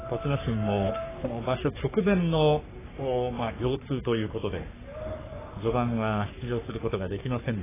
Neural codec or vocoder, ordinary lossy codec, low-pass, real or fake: codec, 44.1 kHz, 3.4 kbps, Pupu-Codec; AAC, 16 kbps; 3.6 kHz; fake